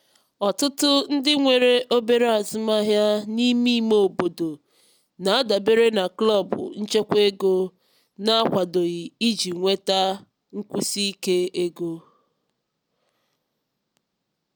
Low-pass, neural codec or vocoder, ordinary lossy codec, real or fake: none; none; none; real